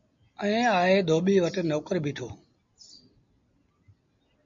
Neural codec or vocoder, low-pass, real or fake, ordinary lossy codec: none; 7.2 kHz; real; MP3, 48 kbps